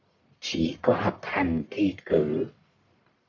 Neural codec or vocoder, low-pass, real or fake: codec, 44.1 kHz, 1.7 kbps, Pupu-Codec; 7.2 kHz; fake